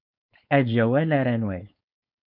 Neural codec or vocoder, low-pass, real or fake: codec, 16 kHz, 4.8 kbps, FACodec; 5.4 kHz; fake